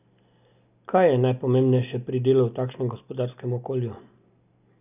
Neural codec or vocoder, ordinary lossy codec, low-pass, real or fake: none; none; 3.6 kHz; real